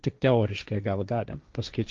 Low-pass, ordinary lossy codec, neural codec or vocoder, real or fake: 7.2 kHz; Opus, 32 kbps; codec, 16 kHz, 1.1 kbps, Voila-Tokenizer; fake